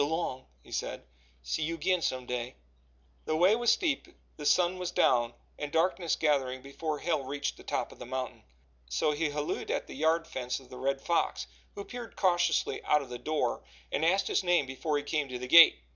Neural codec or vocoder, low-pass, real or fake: none; 7.2 kHz; real